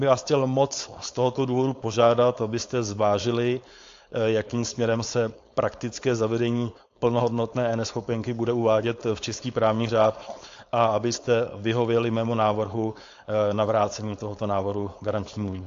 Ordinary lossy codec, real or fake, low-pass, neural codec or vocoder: AAC, 48 kbps; fake; 7.2 kHz; codec, 16 kHz, 4.8 kbps, FACodec